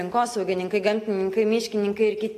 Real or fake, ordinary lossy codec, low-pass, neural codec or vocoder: real; AAC, 48 kbps; 14.4 kHz; none